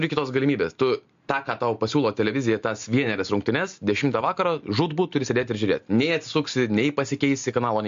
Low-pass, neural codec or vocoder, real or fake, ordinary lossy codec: 7.2 kHz; none; real; MP3, 48 kbps